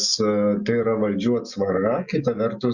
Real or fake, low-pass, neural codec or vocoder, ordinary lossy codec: real; 7.2 kHz; none; Opus, 64 kbps